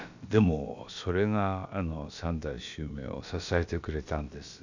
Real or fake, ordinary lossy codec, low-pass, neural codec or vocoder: fake; none; 7.2 kHz; codec, 16 kHz, about 1 kbps, DyCAST, with the encoder's durations